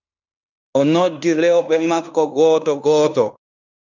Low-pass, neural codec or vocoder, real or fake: 7.2 kHz; codec, 16 kHz in and 24 kHz out, 0.9 kbps, LongCat-Audio-Codec, fine tuned four codebook decoder; fake